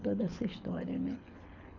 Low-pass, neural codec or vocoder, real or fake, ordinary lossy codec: 7.2 kHz; codec, 24 kHz, 6 kbps, HILCodec; fake; none